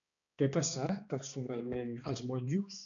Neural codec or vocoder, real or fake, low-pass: codec, 16 kHz, 2 kbps, X-Codec, HuBERT features, trained on balanced general audio; fake; 7.2 kHz